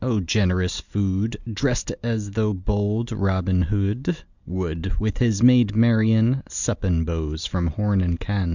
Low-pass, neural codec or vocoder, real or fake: 7.2 kHz; none; real